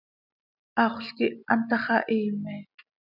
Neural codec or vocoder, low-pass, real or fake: none; 5.4 kHz; real